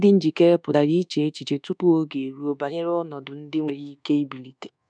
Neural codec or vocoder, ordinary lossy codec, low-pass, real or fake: codec, 24 kHz, 1.2 kbps, DualCodec; none; 9.9 kHz; fake